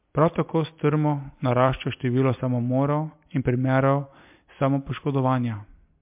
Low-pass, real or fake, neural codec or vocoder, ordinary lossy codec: 3.6 kHz; real; none; MP3, 32 kbps